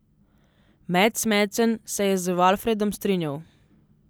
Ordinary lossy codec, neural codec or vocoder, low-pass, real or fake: none; none; none; real